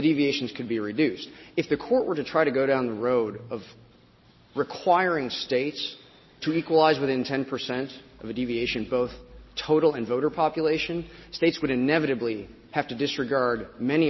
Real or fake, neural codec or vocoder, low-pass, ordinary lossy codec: real; none; 7.2 kHz; MP3, 24 kbps